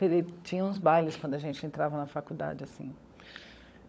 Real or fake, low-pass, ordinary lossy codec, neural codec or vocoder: fake; none; none; codec, 16 kHz, 16 kbps, FunCodec, trained on LibriTTS, 50 frames a second